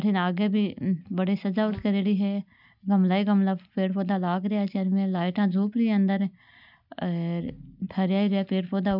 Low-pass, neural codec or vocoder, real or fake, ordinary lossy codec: 5.4 kHz; none; real; none